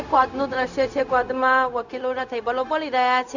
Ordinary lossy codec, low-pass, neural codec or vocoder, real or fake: none; 7.2 kHz; codec, 16 kHz, 0.4 kbps, LongCat-Audio-Codec; fake